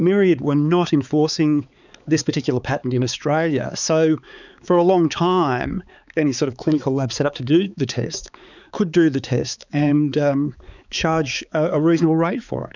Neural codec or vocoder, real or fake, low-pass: codec, 16 kHz, 4 kbps, X-Codec, HuBERT features, trained on balanced general audio; fake; 7.2 kHz